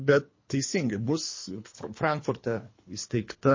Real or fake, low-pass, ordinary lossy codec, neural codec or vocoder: fake; 7.2 kHz; MP3, 32 kbps; codec, 24 kHz, 3 kbps, HILCodec